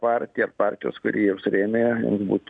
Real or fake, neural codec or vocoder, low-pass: real; none; 9.9 kHz